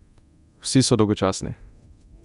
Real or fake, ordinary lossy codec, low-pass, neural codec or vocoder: fake; none; 10.8 kHz; codec, 24 kHz, 0.9 kbps, DualCodec